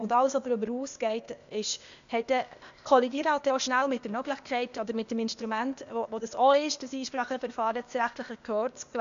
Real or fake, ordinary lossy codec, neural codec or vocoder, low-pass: fake; AAC, 96 kbps; codec, 16 kHz, 0.8 kbps, ZipCodec; 7.2 kHz